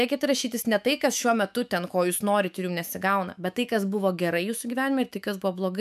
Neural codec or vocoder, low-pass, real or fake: autoencoder, 48 kHz, 128 numbers a frame, DAC-VAE, trained on Japanese speech; 14.4 kHz; fake